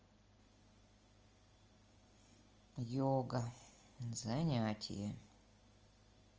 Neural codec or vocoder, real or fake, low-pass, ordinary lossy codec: none; real; 7.2 kHz; Opus, 24 kbps